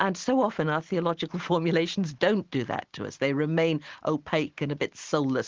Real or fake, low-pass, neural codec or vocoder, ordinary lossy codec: real; 7.2 kHz; none; Opus, 16 kbps